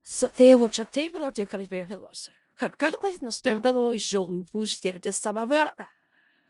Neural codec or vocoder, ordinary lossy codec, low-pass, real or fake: codec, 16 kHz in and 24 kHz out, 0.4 kbps, LongCat-Audio-Codec, four codebook decoder; Opus, 64 kbps; 10.8 kHz; fake